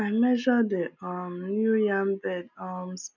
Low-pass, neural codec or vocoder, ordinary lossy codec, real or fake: 7.2 kHz; none; none; real